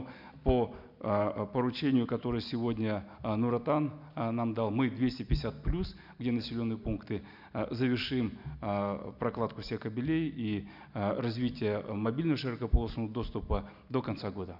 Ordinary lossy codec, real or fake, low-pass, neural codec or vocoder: none; real; 5.4 kHz; none